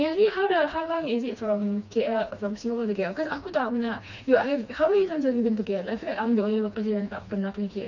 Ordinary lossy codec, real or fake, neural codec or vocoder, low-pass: none; fake; codec, 16 kHz, 2 kbps, FreqCodec, smaller model; 7.2 kHz